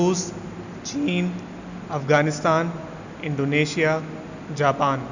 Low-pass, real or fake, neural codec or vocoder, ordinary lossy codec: 7.2 kHz; real; none; none